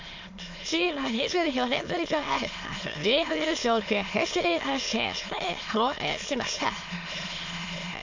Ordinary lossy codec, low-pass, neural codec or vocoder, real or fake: MP3, 48 kbps; 7.2 kHz; autoencoder, 22.05 kHz, a latent of 192 numbers a frame, VITS, trained on many speakers; fake